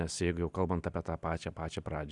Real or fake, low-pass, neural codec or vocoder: real; 10.8 kHz; none